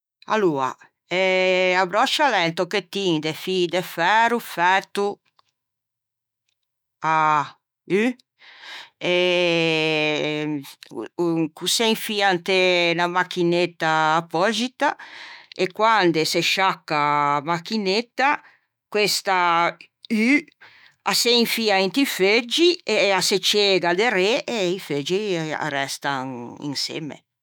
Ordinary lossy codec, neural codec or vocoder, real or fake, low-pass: none; autoencoder, 48 kHz, 128 numbers a frame, DAC-VAE, trained on Japanese speech; fake; none